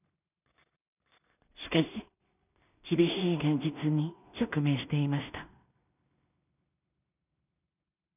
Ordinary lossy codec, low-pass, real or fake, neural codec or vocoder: none; 3.6 kHz; fake; codec, 16 kHz in and 24 kHz out, 0.4 kbps, LongCat-Audio-Codec, two codebook decoder